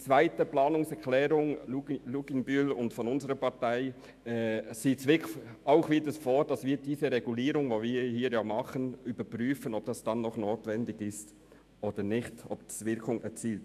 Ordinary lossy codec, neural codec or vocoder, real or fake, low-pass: AAC, 96 kbps; autoencoder, 48 kHz, 128 numbers a frame, DAC-VAE, trained on Japanese speech; fake; 14.4 kHz